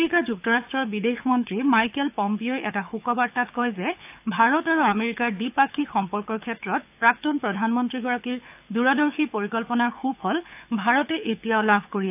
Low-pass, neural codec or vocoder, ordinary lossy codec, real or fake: 3.6 kHz; codec, 24 kHz, 6 kbps, HILCodec; AAC, 32 kbps; fake